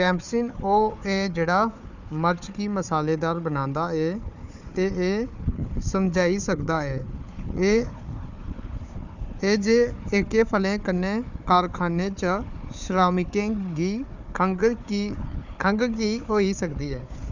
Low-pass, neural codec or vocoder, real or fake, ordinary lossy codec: 7.2 kHz; codec, 16 kHz, 4 kbps, FunCodec, trained on Chinese and English, 50 frames a second; fake; none